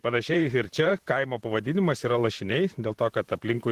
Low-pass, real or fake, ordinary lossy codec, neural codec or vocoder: 14.4 kHz; fake; Opus, 16 kbps; vocoder, 44.1 kHz, 128 mel bands, Pupu-Vocoder